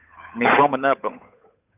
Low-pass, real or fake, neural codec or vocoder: 3.6 kHz; fake; codec, 16 kHz, 8 kbps, FunCodec, trained on Chinese and English, 25 frames a second